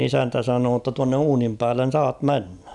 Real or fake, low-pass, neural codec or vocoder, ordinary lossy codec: real; 10.8 kHz; none; none